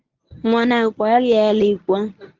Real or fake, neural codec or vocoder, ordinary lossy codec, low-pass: fake; codec, 24 kHz, 0.9 kbps, WavTokenizer, medium speech release version 1; Opus, 24 kbps; 7.2 kHz